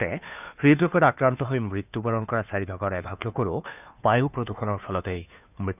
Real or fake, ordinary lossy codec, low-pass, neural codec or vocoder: fake; none; 3.6 kHz; codec, 16 kHz, 2 kbps, FunCodec, trained on Chinese and English, 25 frames a second